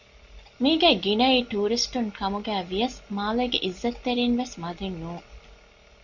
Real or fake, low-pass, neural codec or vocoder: real; 7.2 kHz; none